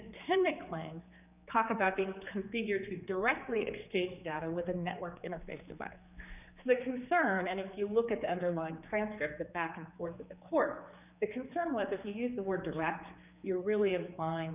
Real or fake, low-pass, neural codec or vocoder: fake; 3.6 kHz; codec, 16 kHz, 4 kbps, X-Codec, HuBERT features, trained on general audio